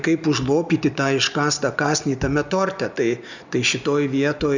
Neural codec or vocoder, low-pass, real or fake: none; 7.2 kHz; real